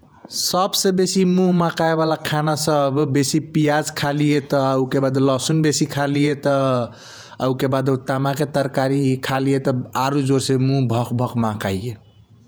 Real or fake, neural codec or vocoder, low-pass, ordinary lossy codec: fake; vocoder, 48 kHz, 128 mel bands, Vocos; none; none